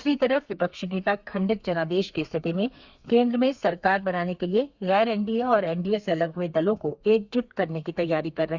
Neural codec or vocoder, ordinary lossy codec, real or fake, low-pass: codec, 32 kHz, 1.9 kbps, SNAC; Opus, 64 kbps; fake; 7.2 kHz